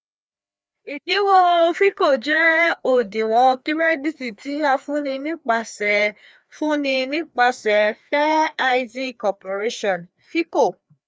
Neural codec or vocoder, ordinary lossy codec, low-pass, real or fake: codec, 16 kHz, 2 kbps, FreqCodec, larger model; none; none; fake